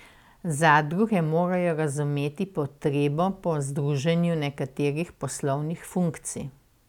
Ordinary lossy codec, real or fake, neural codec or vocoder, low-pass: none; real; none; 19.8 kHz